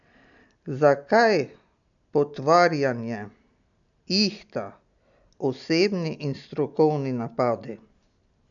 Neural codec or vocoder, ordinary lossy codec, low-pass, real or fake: none; none; 7.2 kHz; real